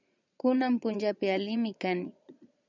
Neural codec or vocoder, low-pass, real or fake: vocoder, 22.05 kHz, 80 mel bands, Vocos; 7.2 kHz; fake